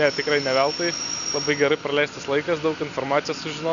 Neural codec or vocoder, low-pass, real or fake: none; 7.2 kHz; real